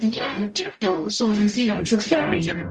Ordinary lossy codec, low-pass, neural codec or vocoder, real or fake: Opus, 32 kbps; 10.8 kHz; codec, 44.1 kHz, 0.9 kbps, DAC; fake